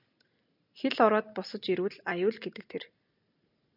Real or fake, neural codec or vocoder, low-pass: real; none; 5.4 kHz